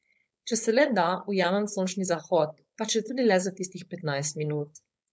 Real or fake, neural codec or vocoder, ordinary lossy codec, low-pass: fake; codec, 16 kHz, 4.8 kbps, FACodec; none; none